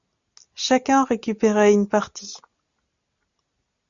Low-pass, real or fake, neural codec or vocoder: 7.2 kHz; real; none